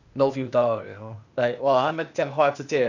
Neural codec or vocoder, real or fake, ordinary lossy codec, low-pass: codec, 16 kHz, 0.8 kbps, ZipCodec; fake; none; 7.2 kHz